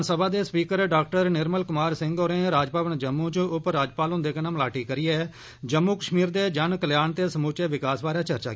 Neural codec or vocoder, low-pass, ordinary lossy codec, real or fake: none; none; none; real